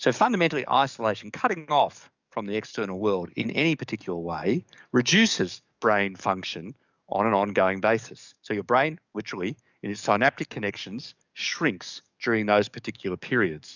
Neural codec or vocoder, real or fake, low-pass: codec, 44.1 kHz, 7.8 kbps, DAC; fake; 7.2 kHz